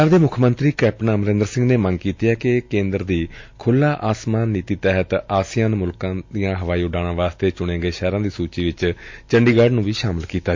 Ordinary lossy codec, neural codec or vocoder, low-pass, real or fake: MP3, 48 kbps; none; 7.2 kHz; real